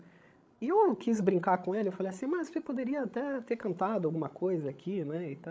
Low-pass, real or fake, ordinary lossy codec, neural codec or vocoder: none; fake; none; codec, 16 kHz, 16 kbps, FreqCodec, larger model